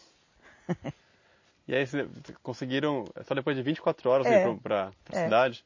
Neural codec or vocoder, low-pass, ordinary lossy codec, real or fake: none; 7.2 kHz; MP3, 32 kbps; real